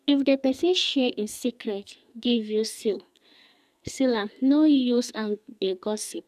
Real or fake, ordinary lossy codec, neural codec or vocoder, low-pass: fake; none; codec, 44.1 kHz, 2.6 kbps, SNAC; 14.4 kHz